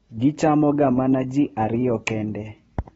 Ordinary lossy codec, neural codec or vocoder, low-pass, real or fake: AAC, 24 kbps; none; 10.8 kHz; real